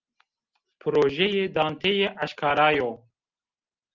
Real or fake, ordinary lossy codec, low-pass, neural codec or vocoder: real; Opus, 32 kbps; 7.2 kHz; none